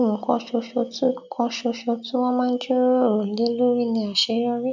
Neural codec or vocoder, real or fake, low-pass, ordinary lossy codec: none; real; 7.2 kHz; none